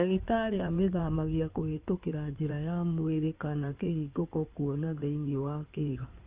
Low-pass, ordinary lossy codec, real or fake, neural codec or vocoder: 3.6 kHz; Opus, 24 kbps; fake; codec, 16 kHz in and 24 kHz out, 2.2 kbps, FireRedTTS-2 codec